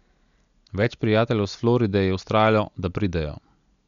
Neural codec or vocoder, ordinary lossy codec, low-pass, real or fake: none; none; 7.2 kHz; real